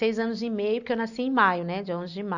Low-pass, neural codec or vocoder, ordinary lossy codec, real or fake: 7.2 kHz; none; none; real